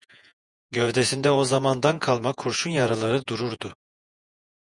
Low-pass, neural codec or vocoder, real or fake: 10.8 kHz; vocoder, 48 kHz, 128 mel bands, Vocos; fake